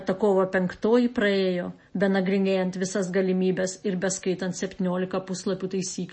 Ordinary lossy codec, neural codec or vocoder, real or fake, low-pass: MP3, 32 kbps; none; real; 9.9 kHz